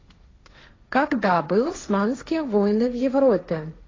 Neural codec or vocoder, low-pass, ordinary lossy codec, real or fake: codec, 16 kHz, 1.1 kbps, Voila-Tokenizer; 7.2 kHz; AAC, 32 kbps; fake